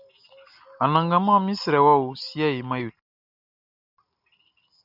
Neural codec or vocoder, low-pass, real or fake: none; 5.4 kHz; real